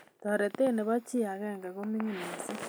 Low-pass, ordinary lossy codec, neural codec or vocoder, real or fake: none; none; none; real